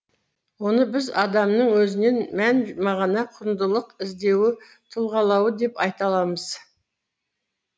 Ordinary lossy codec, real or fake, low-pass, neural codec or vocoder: none; real; none; none